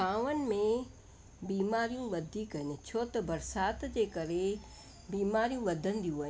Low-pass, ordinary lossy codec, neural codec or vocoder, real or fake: none; none; none; real